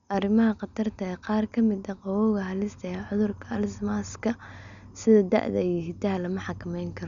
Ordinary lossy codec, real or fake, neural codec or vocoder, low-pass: none; real; none; 7.2 kHz